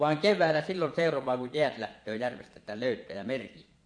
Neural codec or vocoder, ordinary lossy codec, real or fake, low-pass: vocoder, 22.05 kHz, 80 mel bands, Vocos; MP3, 48 kbps; fake; 9.9 kHz